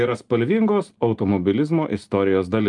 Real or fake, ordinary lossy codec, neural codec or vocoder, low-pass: real; Opus, 32 kbps; none; 10.8 kHz